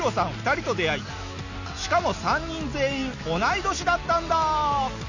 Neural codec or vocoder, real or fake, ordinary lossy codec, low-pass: none; real; none; 7.2 kHz